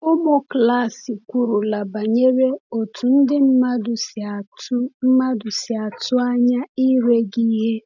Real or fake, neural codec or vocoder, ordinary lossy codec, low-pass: real; none; none; 7.2 kHz